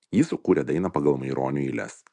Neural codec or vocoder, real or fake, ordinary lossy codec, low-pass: none; real; MP3, 96 kbps; 10.8 kHz